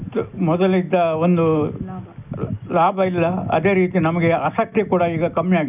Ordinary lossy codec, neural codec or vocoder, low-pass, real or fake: none; none; 3.6 kHz; real